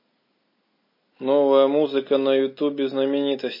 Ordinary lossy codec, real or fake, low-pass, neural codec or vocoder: MP3, 24 kbps; real; 5.4 kHz; none